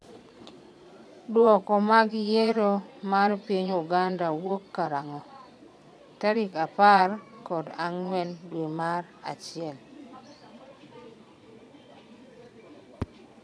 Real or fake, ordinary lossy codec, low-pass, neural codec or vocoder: fake; none; none; vocoder, 22.05 kHz, 80 mel bands, Vocos